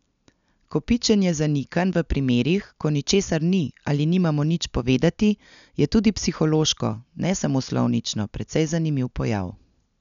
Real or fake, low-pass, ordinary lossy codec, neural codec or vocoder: real; 7.2 kHz; none; none